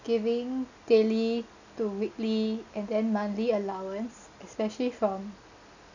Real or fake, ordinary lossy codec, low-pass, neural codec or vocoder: real; none; 7.2 kHz; none